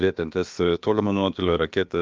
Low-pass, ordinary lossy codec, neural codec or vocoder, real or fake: 7.2 kHz; Opus, 24 kbps; codec, 16 kHz, about 1 kbps, DyCAST, with the encoder's durations; fake